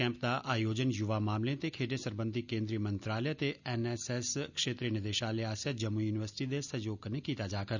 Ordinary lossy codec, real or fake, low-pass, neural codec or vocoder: none; real; 7.2 kHz; none